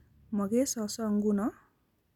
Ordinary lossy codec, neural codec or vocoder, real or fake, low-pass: none; none; real; 19.8 kHz